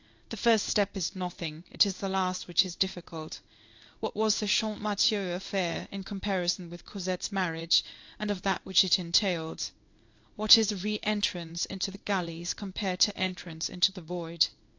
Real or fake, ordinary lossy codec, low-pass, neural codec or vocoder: fake; AAC, 48 kbps; 7.2 kHz; codec, 16 kHz in and 24 kHz out, 1 kbps, XY-Tokenizer